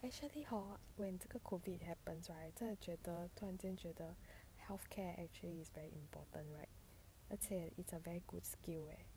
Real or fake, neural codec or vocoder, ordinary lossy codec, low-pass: fake; vocoder, 44.1 kHz, 128 mel bands every 512 samples, BigVGAN v2; none; none